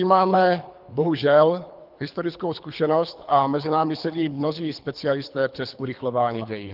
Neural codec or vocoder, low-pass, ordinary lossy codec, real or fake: codec, 24 kHz, 3 kbps, HILCodec; 5.4 kHz; Opus, 32 kbps; fake